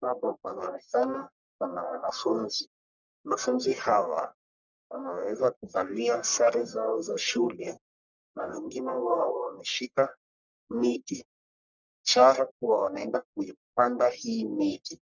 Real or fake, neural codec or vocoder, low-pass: fake; codec, 44.1 kHz, 1.7 kbps, Pupu-Codec; 7.2 kHz